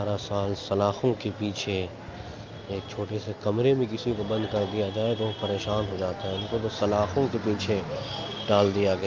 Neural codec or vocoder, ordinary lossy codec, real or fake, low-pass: none; Opus, 24 kbps; real; 7.2 kHz